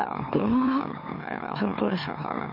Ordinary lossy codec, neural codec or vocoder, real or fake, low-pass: MP3, 32 kbps; autoencoder, 44.1 kHz, a latent of 192 numbers a frame, MeloTTS; fake; 5.4 kHz